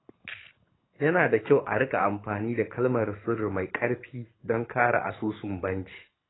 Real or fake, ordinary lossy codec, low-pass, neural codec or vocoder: fake; AAC, 16 kbps; 7.2 kHz; codec, 24 kHz, 6 kbps, HILCodec